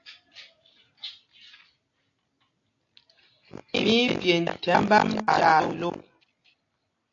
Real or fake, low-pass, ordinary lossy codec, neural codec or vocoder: real; 7.2 kHz; AAC, 48 kbps; none